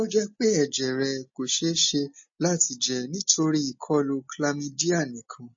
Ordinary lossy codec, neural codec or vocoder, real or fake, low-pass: MP3, 32 kbps; none; real; 7.2 kHz